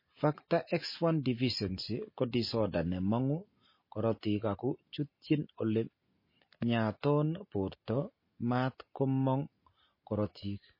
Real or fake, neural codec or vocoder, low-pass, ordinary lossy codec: real; none; 5.4 kHz; MP3, 24 kbps